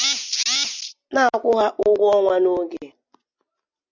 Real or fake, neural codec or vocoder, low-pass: real; none; 7.2 kHz